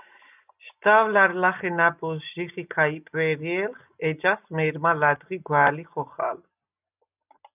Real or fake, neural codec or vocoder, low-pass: real; none; 3.6 kHz